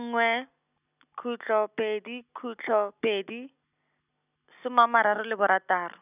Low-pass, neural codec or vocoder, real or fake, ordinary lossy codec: 3.6 kHz; none; real; none